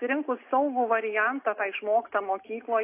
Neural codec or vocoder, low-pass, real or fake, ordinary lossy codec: none; 3.6 kHz; real; AAC, 24 kbps